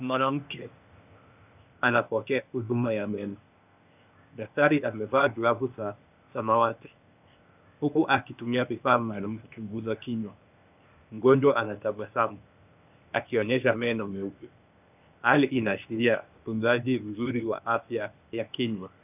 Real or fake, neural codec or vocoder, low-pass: fake; codec, 16 kHz, 0.8 kbps, ZipCodec; 3.6 kHz